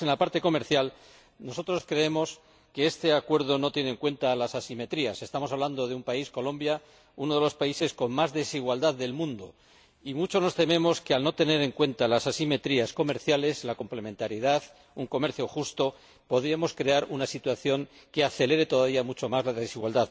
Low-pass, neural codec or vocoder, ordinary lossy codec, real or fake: none; none; none; real